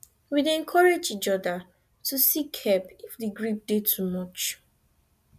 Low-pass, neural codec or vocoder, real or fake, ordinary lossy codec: 14.4 kHz; none; real; none